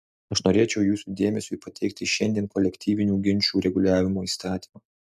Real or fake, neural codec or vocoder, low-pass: real; none; 14.4 kHz